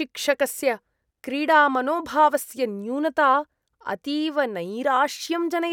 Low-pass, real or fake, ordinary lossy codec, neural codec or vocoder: 19.8 kHz; real; none; none